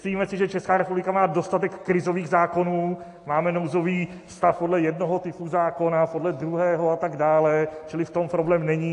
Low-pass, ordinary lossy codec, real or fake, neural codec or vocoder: 10.8 kHz; AAC, 48 kbps; real; none